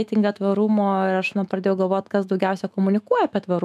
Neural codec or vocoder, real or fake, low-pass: autoencoder, 48 kHz, 128 numbers a frame, DAC-VAE, trained on Japanese speech; fake; 14.4 kHz